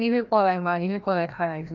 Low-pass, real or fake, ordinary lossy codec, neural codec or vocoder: 7.2 kHz; fake; MP3, 64 kbps; codec, 16 kHz, 1 kbps, FreqCodec, larger model